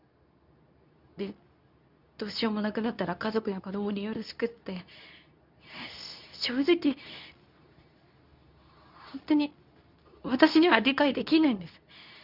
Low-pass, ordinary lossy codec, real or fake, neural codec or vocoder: 5.4 kHz; none; fake; codec, 24 kHz, 0.9 kbps, WavTokenizer, medium speech release version 2